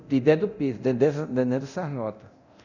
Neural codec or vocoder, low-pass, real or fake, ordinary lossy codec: codec, 24 kHz, 0.9 kbps, DualCodec; 7.2 kHz; fake; none